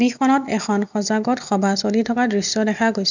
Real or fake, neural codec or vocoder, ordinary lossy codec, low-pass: real; none; none; 7.2 kHz